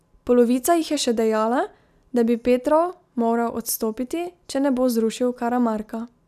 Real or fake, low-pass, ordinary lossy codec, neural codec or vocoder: real; 14.4 kHz; none; none